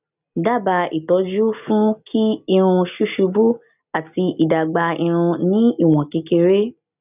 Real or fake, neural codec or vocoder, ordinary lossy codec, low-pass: real; none; none; 3.6 kHz